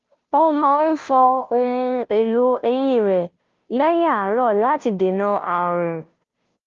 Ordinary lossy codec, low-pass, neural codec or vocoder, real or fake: Opus, 32 kbps; 7.2 kHz; codec, 16 kHz, 0.5 kbps, FunCodec, trained on Chinese and English, 25 frames a second; fake